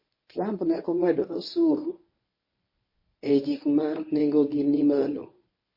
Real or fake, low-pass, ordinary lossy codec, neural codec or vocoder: fake; 5.4 kHz; MP3, 24 kbps; codec, 24 kHz, 0.9 kbps, WavTokenizer, medium speech release version 1